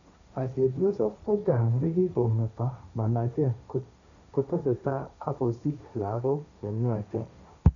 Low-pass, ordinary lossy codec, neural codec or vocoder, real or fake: 7.2 kHz; none; codec, 16 kHz, 1.1 kbps, Voila-Tokenizer; fake